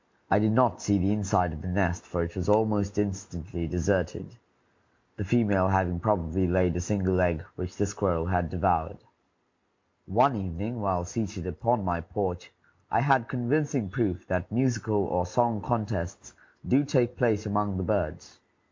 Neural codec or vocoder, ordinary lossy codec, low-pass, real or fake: none; MP3, 48 kbps; 7.2 kHz; real